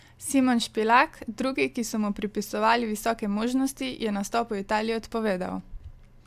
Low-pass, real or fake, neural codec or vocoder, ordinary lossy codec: 14.4 kHz; real; none; AAC, 96 kbps